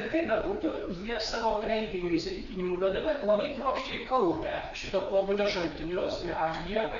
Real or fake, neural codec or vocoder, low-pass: fake; codec, 16 kHz, 2 kbps, FreqCodec, larger model; 7.2 kHz